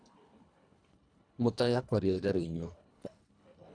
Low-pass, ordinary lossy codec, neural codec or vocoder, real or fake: 9.9 kHz; none; codec, 24 kHz, 1.5 kbps, HILCodec; fake